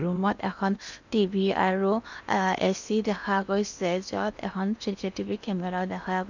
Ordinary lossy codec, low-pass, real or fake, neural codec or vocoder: none; 7.2 kHz; fake; codec, 16 kHz in and 24 kHz out, 0.8 kbps, FocalCodec, streaming, 65536 codes